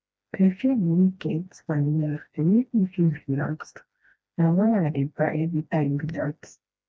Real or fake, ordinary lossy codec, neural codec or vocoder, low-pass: fake; none; codec, 16 kHz, 1 kbps, FreqCodec, smaller model; none